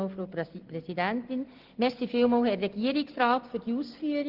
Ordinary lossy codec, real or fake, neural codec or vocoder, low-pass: Opus, 16 kbps; real; none; 5.4 kHz